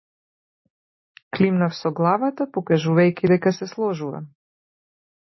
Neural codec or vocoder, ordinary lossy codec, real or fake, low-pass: none; MP3, 24 kbps; real; 7.2 kHz